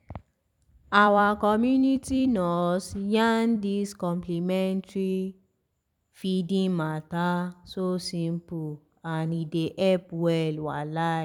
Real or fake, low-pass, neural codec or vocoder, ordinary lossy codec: fake; 19.8 kHz; vocoder, 44.1 kHz, 128 mel bands every 256 samples, BigVGAN v2; none